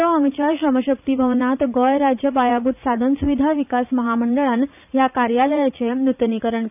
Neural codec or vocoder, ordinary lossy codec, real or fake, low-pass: vocoder, 44.1 kHz, 80 mel bands, Vocos; none; fake; 3.6 kHz